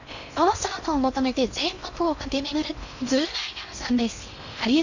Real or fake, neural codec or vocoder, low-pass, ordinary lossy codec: fake; codec, 16 kHz in and 24 kHz out, 0.6 kbps, FocalCodec, streaming, 4096 codes; 7.2 kHz; none